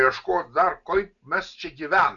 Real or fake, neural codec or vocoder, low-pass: real; none; 7.2 kHz